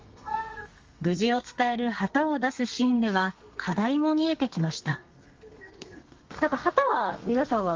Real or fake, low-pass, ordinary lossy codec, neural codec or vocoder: fake; 7.2 kHz; Opus, 32 kbps; codec, 32 kHz, 1.9 kbps, SNAC